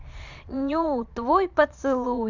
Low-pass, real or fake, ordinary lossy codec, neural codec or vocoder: 7.2 kHz; fake; none; vocoder, 22.05 kHz, 80 mel bands, Vocos